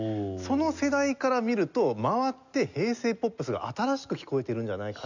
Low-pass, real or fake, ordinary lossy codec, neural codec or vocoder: 7.2 kHz; real; none; none